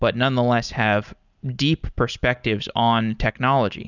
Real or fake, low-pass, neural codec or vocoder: real; 7.2 kHz; none